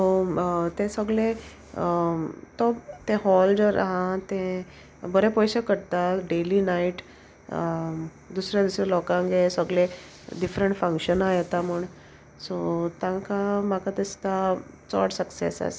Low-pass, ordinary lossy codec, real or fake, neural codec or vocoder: none; none; real; none